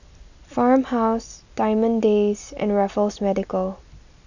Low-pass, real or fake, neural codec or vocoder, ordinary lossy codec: 7.2 kHz; real; none; none